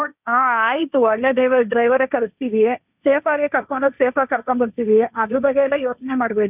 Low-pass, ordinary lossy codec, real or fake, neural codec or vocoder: 3.6 kHz; none; fake; codec, 16 kHz, 1.1 kbps, Voila-Tokenizer